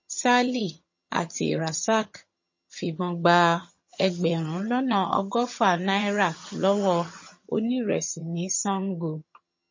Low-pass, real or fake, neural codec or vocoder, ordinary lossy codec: 7.2 kHz; fake; vocoder, 22.05 kHz, 80 mel bands, HiFi-GAN; MP3, 32 kbps